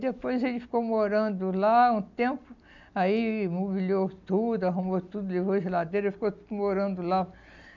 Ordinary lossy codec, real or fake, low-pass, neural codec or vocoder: none; real; 7.2 kHz; none